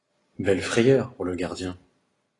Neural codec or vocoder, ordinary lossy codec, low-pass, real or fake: none; AAC, 32 kbps; 10.8 kHz; real